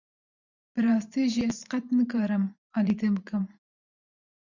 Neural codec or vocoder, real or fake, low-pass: vocoder, 44.1 kHz, 128 mel bands every 512 samples, BigVGAN v2; fake; 7.2 kHz